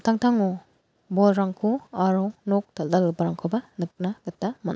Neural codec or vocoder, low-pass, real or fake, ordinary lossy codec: none; none; real; none